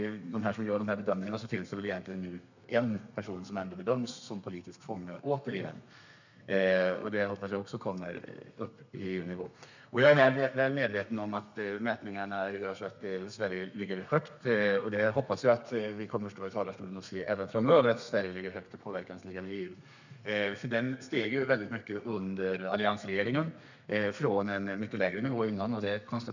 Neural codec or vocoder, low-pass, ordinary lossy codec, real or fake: codec, 32 kHz, 1.9 kbps, SNAC; 7.2 kHz; none; fake